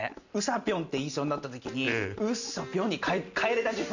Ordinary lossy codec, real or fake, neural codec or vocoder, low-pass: none; real; none; 7.2 kHz